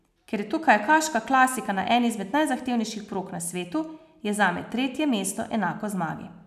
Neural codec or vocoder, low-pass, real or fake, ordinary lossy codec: none; 14.4 kHz; real; none